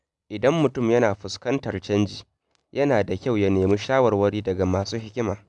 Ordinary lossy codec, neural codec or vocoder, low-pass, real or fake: none; none; 10.8 kHz; real